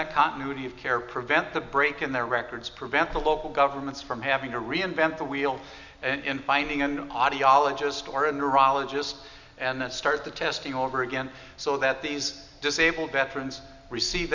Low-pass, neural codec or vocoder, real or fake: 7.2 kHz; none; real